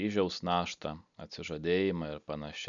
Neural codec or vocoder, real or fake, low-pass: none; real; 7.2 kHz